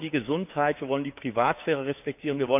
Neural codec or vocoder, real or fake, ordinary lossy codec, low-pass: codec, 16 kHz, 6 kbps, DAC; fake; none; 3.6 kHz